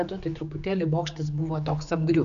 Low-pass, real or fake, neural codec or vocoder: 7.2 kHz; fake; codec, 16 kHz, 4 kbps, X-Codec, HuBERT features, trained on general audio